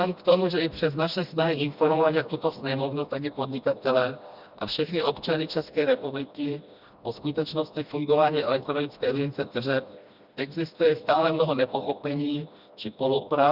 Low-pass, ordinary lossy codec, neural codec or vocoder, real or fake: 5.4 kHz; Opus, 64 kbps; codec, 16 kHz, 1 kbps, FreqCodec, smaller model; fake